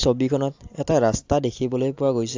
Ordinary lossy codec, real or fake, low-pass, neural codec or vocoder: AAC, 48 kbps; real; 7.2 kHz; none